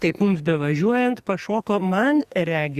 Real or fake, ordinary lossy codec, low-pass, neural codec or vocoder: fake; Opus, 64 kbps; 14.4 kHz; codec, 44.1 kHz, 2.6 kbps, SNAC